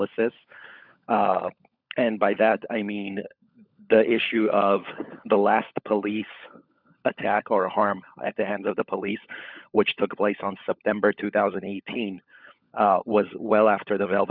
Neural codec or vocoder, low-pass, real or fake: codec, 16 kHz, 16 kbps, FunCodec, trained on LibriTTS, 50 frames a second; 5.4 kHz; fake